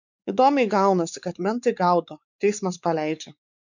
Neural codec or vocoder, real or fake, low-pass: codec, 16 kHz, 4 kbps, X-Codec, WavLM features, trained on Multilingual LibriSpeech; fake; 7.2 kHz